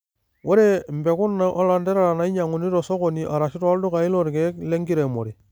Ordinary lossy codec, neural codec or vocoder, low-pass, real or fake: none; none; none; real